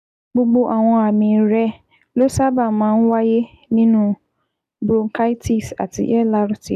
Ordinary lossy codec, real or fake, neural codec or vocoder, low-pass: none; real; none; 14.4 kHz